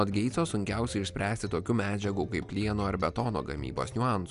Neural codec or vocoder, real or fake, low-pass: none; real; 10.8 kHz